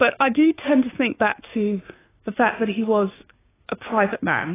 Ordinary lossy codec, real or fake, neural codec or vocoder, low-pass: AAC, 16 kbps; fake; codec, 16 kHz, 6 kbps, DAC; 3.6 kHz